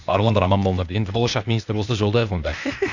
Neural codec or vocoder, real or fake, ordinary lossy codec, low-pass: codec, 16 kHz, 0.8 kbps, ZipCodec; fake; none; 7.2 kHz